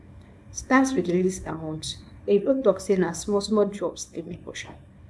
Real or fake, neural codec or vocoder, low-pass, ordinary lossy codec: fake; codec, 24 kHz, 0.9 kbps, WavTokenizer, small release; none; none